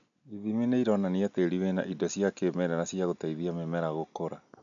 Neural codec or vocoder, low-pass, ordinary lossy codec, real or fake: none; 7.2 kHz; none; real